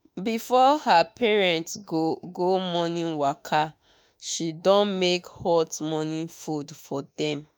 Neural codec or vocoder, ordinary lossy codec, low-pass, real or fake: autoencoder, 48 kHz, 32 numbers a frame, DAC-VAE, trained on Japanese speech; none; none; fake